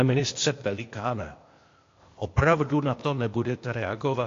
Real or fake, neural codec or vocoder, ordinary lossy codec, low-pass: fake; codec, 16 kHz, 0.8 kbps, ZipCodec; AAC, 48 kbps; 7.2 kHz